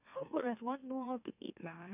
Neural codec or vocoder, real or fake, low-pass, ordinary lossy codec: autoencoder, 44.1 kHz, a latent of 192 numbers a frame, MeloTTS; fake; 3.6 kHz; none